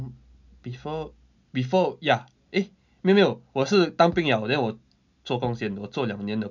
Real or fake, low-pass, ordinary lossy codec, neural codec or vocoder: real; 7.2 kHz; none; none